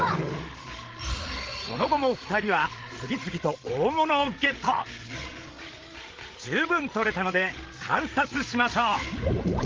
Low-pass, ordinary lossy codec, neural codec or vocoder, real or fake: 7.2 kHz; Opus, 16 kbps; codec, 16 kHz, 16 kbps, FreqCodec, larger model; fake